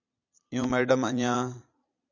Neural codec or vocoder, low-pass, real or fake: vocoder, 22.05 kHz, 80 mel bands, Vocos; 7.2 kHz; fake